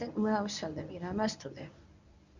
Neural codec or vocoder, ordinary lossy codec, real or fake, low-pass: codec, 24 kHz, 0.9 kbps, WavTokenizer, medium speech release version 1; Opus, 64 kbps; fake; 7.2 kHz